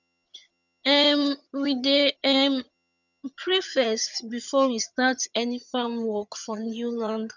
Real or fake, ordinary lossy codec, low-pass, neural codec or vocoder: fake; none; 7.2 kHz; vocoder, 22.05 kHz, 80 mel bands, HiFi-GAN